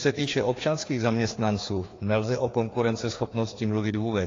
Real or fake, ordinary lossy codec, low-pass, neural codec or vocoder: fake; AAC, 32 kbps; 7.2 kHz; codec, 16 kHz, 2 kbps, FreqCodec, larger model